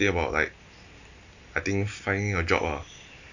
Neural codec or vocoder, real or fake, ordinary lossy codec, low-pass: none; real; none; 7.2 kHz